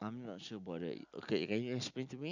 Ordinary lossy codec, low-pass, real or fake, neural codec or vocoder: none; 7.2 kHz; real; none